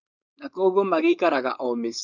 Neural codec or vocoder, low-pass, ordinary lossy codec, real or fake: codec, 16 kHz, 4.8 kbps, FACodec; 7.2 kHz; none; fake